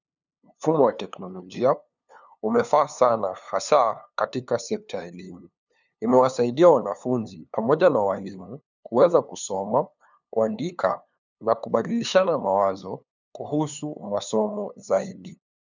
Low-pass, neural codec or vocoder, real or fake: 7.2 kHz; codec, 16 kHz, 2 kbps, FunCodec, trained on LibriTTS, 25 frames a second; fake